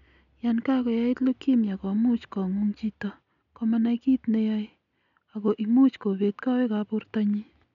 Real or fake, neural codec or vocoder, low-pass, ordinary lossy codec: real; none; 7.2 kHz; none